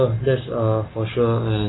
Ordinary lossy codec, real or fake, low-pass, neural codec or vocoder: AAC, 16 kbps; real; 7.2 kHz; none